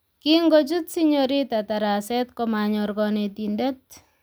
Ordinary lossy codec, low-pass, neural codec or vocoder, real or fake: none; none; none; real